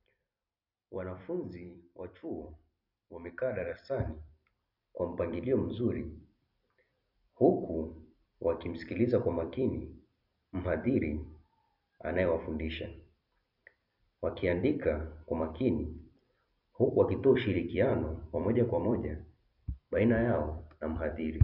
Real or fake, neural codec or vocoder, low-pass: fake; vocoder, 44.1 kHz, 128 mel bands every 256 samples, BigVGAN v2; 5.4 kHz